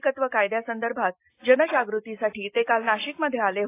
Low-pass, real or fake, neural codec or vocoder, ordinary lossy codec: 3.6 kHz; real; none; AAC, 24 kbps